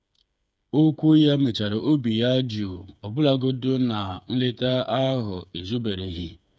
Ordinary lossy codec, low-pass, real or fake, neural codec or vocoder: none; none; fake; codec, 16 kHz, 8 kbps, FreqCodec, smaller model